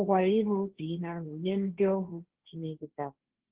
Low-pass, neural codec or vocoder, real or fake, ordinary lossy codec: 3.6 kHz; codec, 16 kHz, 1.1 kbps, Voila-Tokenizer; fake; Opus, 16 kbps